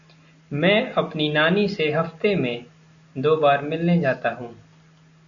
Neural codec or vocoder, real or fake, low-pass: none; real; 7.2 kHz